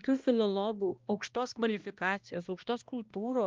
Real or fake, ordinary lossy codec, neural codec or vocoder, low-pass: fake; Opus, 32 kbps; codec, 16 kHz, 1 kbps, X-Codec, HuBERT features, trained on balanced general audio; 7.2 kHz